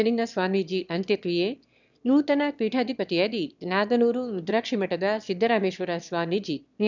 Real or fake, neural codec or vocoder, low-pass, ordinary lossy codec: fake; autoencoder, 22.05 kHz, a latent of 192 numbers a frame, VITS, trained on one speaker; 7.2 kHz; none